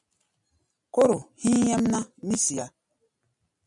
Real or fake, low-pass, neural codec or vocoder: real; 10.8 kHz; none